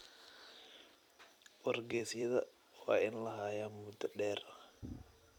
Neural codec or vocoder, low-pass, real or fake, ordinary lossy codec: vocoder, 44.1 kHz, 128 mel bands every 256 samples, BigVGAN v2; 19.8 kHz; fake; none